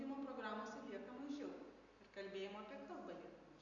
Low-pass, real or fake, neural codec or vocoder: 7.2 kHz; real; none